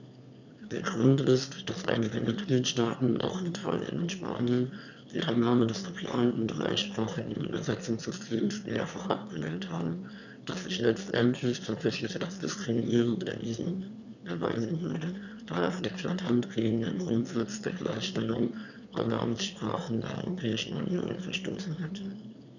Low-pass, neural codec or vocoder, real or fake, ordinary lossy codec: 7.2 kHz; autoencoder, 22.05 kHz, a latent of 192 numbers a frame, VITS, trained on one speaker; fake; none